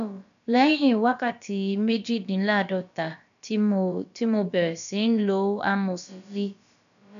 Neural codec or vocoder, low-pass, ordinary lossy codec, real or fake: codec, 16 kHz, about 1 kbps, DyCAST, with the encoder's durations; 7.2 kHz; none; fake